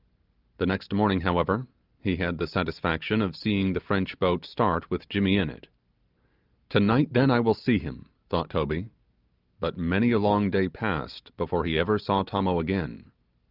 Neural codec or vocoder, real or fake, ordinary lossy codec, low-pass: none; real; Opus, 24 kbps; 5.4 kHz